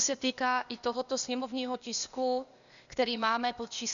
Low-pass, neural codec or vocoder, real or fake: 7.2 kHz; codec, 16 kHz, 0.8 kbps, ZipCodec; fake